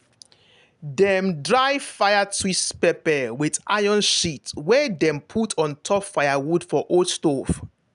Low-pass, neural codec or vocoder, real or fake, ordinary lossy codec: 10.8 kHz; none; real; none